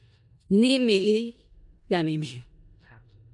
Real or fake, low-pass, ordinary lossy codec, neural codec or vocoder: fake; 10.8 kHz; MP3, 64 kbps; codec, 16 kHz in and 24 kHz out, 0.4 kbps, LongCat-Audio-Codec, four codebook decoder